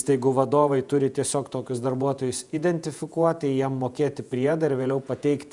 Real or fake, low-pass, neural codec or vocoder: fake; 10.8 kHz; vocoder, 48 kHz, 128 mel bands, Vocos